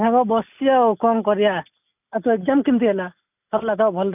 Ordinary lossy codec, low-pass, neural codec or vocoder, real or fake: none; 3.6 kHz; none; real